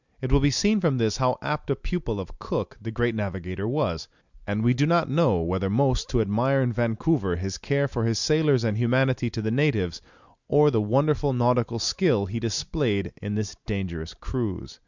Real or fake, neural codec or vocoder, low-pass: real; none; 7.2 kHz